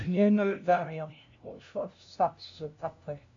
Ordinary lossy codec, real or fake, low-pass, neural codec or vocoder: MP3, 64 kbps; fake; 7.2 kHz; codec, 16 kHz, 0.5 kbps, FunCodec, trained on LibriTTS, 25 frames a second